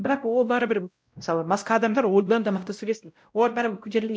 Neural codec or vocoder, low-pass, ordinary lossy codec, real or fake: codec, 16 kHz, 0.5 kbps, X-Codec, WavLM features, trained on Multilingual LibriSpeech; none; none; fake